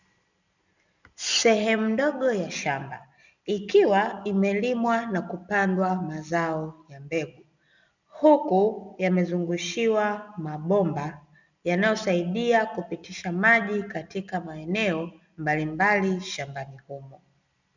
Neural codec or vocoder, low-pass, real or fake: none; 7.2 kHz; real